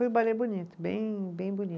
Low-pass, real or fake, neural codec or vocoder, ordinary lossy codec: none; real; none; none